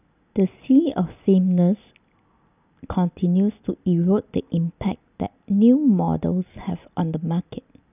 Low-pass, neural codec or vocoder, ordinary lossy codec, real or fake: 3.6 kHz; none; none; real